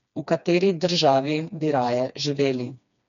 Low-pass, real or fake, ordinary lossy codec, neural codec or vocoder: 7.2 kHz; fake; none; codec, 16 kHz, 2 kbps, FreqCodec, smaller model